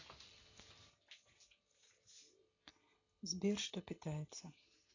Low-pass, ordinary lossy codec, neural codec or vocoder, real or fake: 7.2 kHz; AAC, 32 kbps; none; real